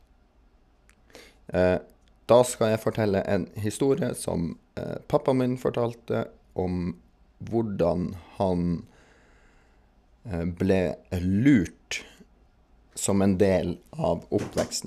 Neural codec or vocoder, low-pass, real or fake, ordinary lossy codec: none; 14.4 kHz; real; none